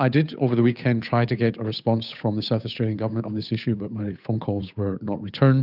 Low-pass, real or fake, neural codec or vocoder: 5.4 kHz; fake; codec, 16 kHz, 8 kbps, FunCodec, trained on Chinese and English, 25 frames a second